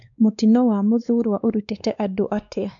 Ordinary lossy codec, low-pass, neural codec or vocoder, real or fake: none; 7.2 kHz; codec, 16 kHz, 2 kbps, X-Codec, WavLM features, trained on Multilingual LibriSpeech; fake